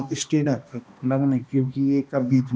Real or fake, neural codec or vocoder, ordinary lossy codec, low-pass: fake; codec, 16 kHz, 1 kbps, X-Codec, HuBERT features, trained on balanced general audio; none; none